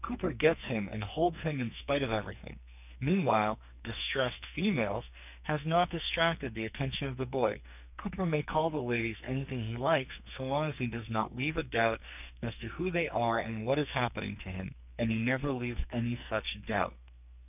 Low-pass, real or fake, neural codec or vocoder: 3.6 kHz; fake; codec, 44.1 kHz, 2.6 kbps, SNAC